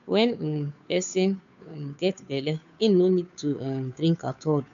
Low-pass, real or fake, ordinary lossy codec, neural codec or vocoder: 7.2 kHz; fake; none; codec, 16 kHz, 2 kbps, FunCodec, trained on Chinese and English, 25 frames a second